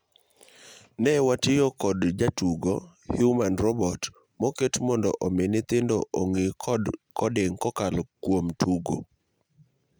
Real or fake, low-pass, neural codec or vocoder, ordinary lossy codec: real; none; none; none